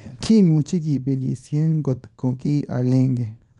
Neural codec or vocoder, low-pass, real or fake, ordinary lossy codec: codec, 24 kHz, 0.9 kbps, WavTokenizer, small release; 10.8 kHz; fake; none